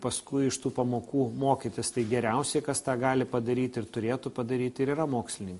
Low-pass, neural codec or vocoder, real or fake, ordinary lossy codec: 14.4 kHz; none; real; MP3, 48 kbps